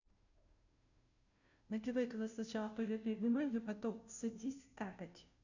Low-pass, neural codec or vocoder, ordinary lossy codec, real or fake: 7.2 kHz; codec, 16 kHz, 0.5 kbps, FunCodec, trained on Chinese and English, 25 frames a second; none; fake